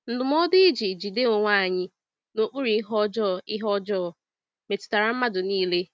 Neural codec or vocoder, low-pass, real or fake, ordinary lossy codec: none; none; real; none